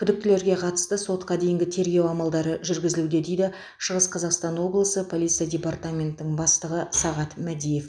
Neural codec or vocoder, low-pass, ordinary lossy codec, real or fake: none; 9.9 kHz; none; real